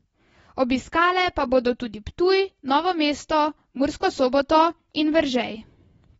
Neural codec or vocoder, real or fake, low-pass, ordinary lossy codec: none; real; 19.8 kHz; AAC, 24 kbps